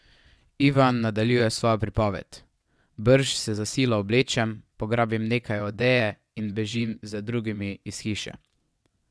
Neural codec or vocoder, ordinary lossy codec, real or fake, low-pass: vocoder, 22.05 kHz, 80 mel bands, WaveNeXt; none; fake; none